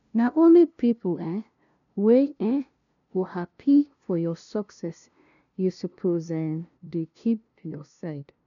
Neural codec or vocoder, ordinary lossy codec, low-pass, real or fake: codec, 16 kHz, 0.5 kbps, FunCodec, trained on LibriTTS, 25 frames a second; none; 7.2 kHz; fake